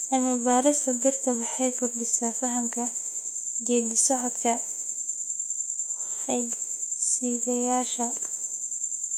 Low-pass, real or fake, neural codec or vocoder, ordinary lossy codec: 19.8 kHz; fake; autoencoder, 48 kHz, 32 numbers a frame, DAC-VAE, trained on Japanese speech; none